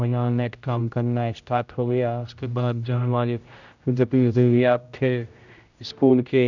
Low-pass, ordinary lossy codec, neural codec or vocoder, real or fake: 7.2 kHz; none; codec, 16 kHz, 0.5 kbps, X-Codec, HuBERT features, trained on general audio; fake